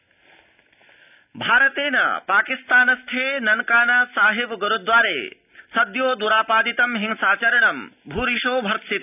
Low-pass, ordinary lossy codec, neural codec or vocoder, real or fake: 3.6 kHz; none; none; real